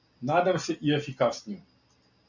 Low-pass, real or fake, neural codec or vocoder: 7.2 kHz; real; none